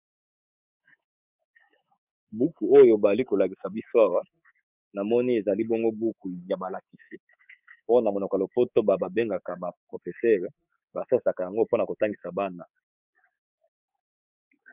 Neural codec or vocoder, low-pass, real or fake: codec, 24 kHz, 3.1 kbps, DualCodec; 3.6 kHz; fake